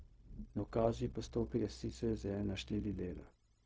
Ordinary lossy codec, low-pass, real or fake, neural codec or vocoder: none; none; fake; codec, 16 kHz, 0.4 kbps, LongCat-Audio-Codec